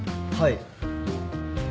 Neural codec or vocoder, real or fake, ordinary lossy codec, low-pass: none; real; none; none